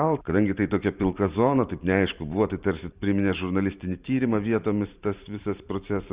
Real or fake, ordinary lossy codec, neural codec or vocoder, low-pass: fake; Opus, 64 kbps; vocoder, 44.1 kHz, 128 mel bands every 512 samples, BigVGAN v2; 3.6 kHz